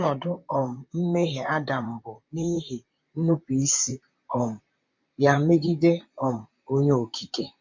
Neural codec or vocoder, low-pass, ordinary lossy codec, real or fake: vocoder, 44.1 kHz, 128 mel bands, Pupu-Vocoder; 7.2 kHz; MP3, 48 kbps; fake